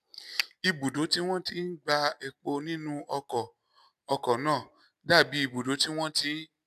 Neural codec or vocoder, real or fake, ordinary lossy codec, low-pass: none; real; none; 14.4 kHz